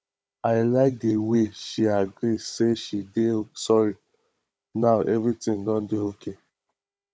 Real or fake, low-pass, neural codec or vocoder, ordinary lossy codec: fake; none; codec, 16 kHz, 16 kbps, FunCodec, trained on Chinese and English, 50 frames a second; none